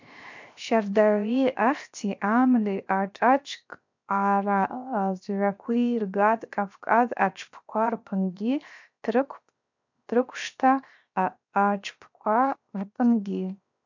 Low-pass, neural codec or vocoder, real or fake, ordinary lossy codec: 7.2 kHz; codec, 16 kHz, 0.7 kbps, FocalCodec; fake; MP3, 64 kbps